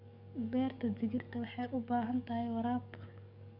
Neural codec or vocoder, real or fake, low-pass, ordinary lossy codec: codec, 44.1 kHz, 7.8 kbps, DAC; fake; 5.4 kHz; none